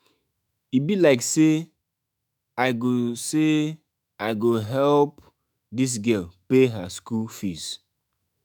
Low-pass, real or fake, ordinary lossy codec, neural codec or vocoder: none; fake; none; autoencoder, 48 kHz, 128 numbers a frame, DAC-VAE, trained on Japanese speech